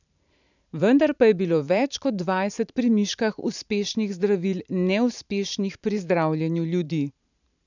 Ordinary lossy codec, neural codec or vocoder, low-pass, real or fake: none; vocoder, 44.1 kHz, 128 mel bands every 512 samples, BigVGAN v2; 7.2 kHz; fake